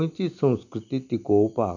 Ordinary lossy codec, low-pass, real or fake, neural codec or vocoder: none; 7.2 kHz; real; none